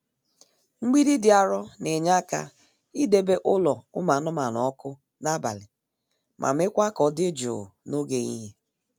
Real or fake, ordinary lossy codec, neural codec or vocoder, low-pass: real; none; none; none